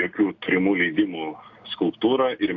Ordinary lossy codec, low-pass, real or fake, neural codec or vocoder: AAC, 48 kbps; 7.2 kHz; real; none